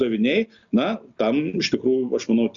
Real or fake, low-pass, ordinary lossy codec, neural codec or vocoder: real; 7.2 kHz; MP3, 96 kbps; none